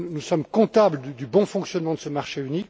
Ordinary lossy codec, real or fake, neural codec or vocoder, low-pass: none; real; none; none